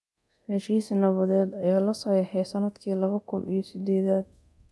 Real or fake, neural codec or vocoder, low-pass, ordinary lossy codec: fake; codec, 24 kHz, 0.9 kbps, DualCodec; none; none